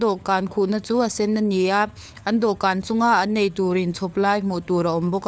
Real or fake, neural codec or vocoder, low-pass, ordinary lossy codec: fake; codec, 16 kHz, 4 kbps, FunCodec, trained on LibriTTS, 50 frames a second; none; none